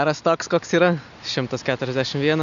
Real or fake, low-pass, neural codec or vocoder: real; 7.2 kHz; none